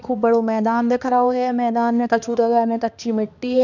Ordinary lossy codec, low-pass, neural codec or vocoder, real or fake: none; 7.2 kHz; codec, 16 kHz, 2 kbps, X-Codec, HuBERT features, trained on balanced general audio; fake